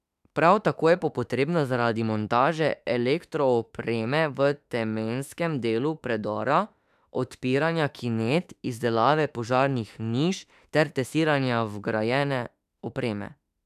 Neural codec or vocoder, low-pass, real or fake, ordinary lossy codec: autoencoder, 48 kHz, 32 numbers a frame, DAC-VAE, trained on Japanese speech; 14.4 kHz; fake; none